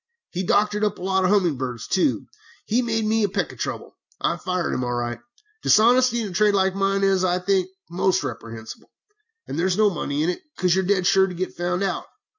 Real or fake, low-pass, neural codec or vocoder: real; 7.2 kHz; none